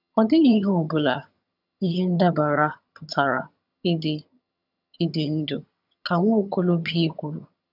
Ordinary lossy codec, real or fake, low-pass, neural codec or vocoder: none; fake; 5.4 kHz; vocoder, 22.05 kHz, 80 mel bands, HiFi-GAN